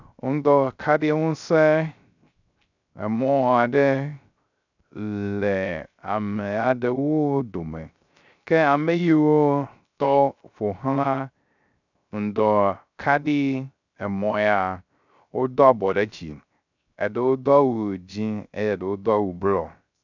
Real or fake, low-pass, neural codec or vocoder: fake; 7.2 kHz; codec, 16 kHz, 0.3 kbps, FocalCodec